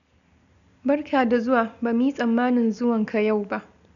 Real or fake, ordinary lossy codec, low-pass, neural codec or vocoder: real; none; 7.2 kHz; none